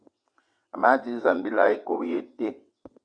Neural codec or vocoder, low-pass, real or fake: vocoder, 22.05 kHz, 80 mel bands, WaveNeXt; 9.9 kHz; fake